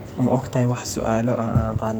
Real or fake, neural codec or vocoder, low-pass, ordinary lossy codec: fake; codec, 44.1 kHz, 2.6 kbps, SNAC; none; none